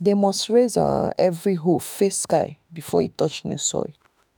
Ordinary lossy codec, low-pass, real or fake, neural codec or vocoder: none; none; fake; autoencoder, 48 kHz, 32 numbers a frame, DAC-VAE, trained on Japanese speech